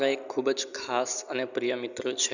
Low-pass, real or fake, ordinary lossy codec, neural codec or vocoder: 7.2 kHz; real; none; none